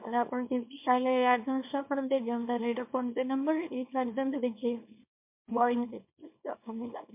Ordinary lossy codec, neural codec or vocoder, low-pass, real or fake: MP3, 32 kbps; codec, 24 kHz, 0.9 kbps, WavTokenizer, small release; 3.6 kHz; fake